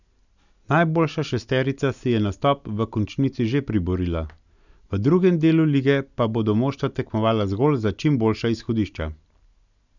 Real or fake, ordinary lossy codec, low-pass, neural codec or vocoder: real; none; 7.2 kHz; none